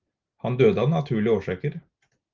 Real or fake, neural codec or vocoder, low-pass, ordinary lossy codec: real; none; 7.2 kHz; Opus, 32 kbps